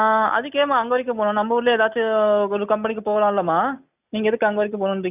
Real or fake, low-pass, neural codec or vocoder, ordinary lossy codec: real; 3.6 kHz; none; none